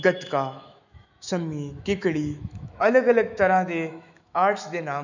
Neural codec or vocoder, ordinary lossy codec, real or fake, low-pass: autoencoder, 48 kHz, 128 numbers a frame, DAC-VAE, trained on Japanese speech; none; fake; 7.2 kHz